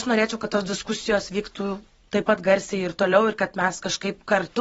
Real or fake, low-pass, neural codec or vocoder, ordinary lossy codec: fake; 19.8 kHz; vocoder, 44.1 kHz, 128 mel bands every 512 samples, BigVGAN v2; AAC, 24 kbps